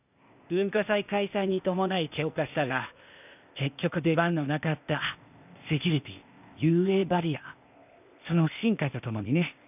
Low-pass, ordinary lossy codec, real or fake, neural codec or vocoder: 3.6 kHz; none; fake; codec, 16 kHz, 0.8 kbps, ZipCodec